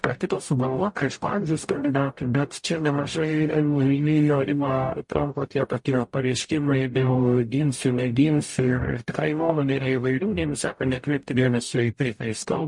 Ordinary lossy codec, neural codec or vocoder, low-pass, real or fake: MP3, 48 kbps; codec, 44.1 kHz, 0.9 kbps, DAC; 10.8 kHz; fake